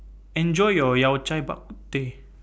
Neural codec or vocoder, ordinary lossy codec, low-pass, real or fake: none; none; none; real